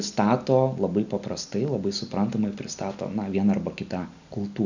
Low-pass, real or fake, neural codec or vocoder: 7.2 kHz; real; none